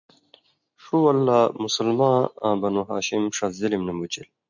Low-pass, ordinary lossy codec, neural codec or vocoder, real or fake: 7.2 kHz; MP3, 64 kbps; none; real